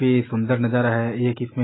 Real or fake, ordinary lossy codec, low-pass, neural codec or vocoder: real; AAC, 16 kbps; 7.2 kHz; none